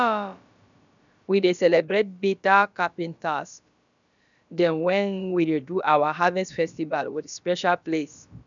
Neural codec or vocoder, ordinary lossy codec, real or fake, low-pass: codec, 16 kHz, about 1 kbps, DyCAST, with the encoder's durations; none; fake; 7.2 kHz